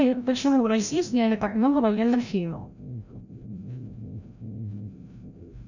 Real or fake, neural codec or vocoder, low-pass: fake; codec, 16 kHz, 0.5 kbps, FreqCodec, larger model; 7.2 kHz